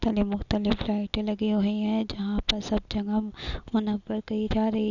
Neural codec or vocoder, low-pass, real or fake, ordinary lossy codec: none; 7.2 kHz; real; none